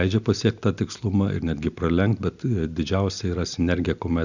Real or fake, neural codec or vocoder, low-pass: real; none; 7.2 kHz